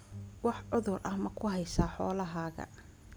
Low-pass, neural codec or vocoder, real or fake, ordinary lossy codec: none; none; real; none